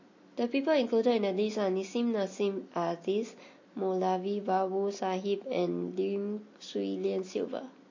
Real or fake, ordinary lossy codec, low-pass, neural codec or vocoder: real; MP3, 32 kbps; 7.2 kHz; none